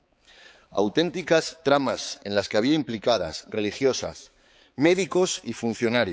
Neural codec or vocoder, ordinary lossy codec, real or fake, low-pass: codec, 16 kHz, 4 kbps, X-Codec, HuBERT features, trained on balanced general audio; none; fake; none